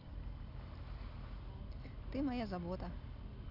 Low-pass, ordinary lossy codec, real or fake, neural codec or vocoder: 5.4 kHz; none; real; none